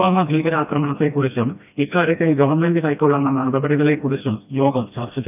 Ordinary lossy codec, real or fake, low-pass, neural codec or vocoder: none; fake; 3.6 kHz; codec, 16 kHz, 1 kbps, FreqCodec, smaller model